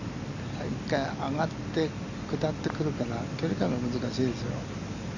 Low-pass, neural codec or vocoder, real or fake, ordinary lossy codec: 7.2 kHz; none; real; none